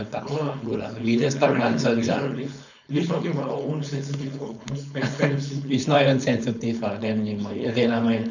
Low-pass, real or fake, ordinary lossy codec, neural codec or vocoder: 7.2 kHz; fake; none; codec, 16 kHz, 4.8 kbps, FACodec